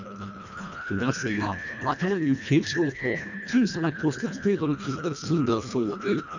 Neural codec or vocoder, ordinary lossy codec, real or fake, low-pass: codec, 24 kHz, 1.5 kbps, HILCodec; none; fake; 7.2 kHz